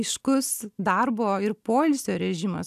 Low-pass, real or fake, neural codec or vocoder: 14.4 kHz; real; none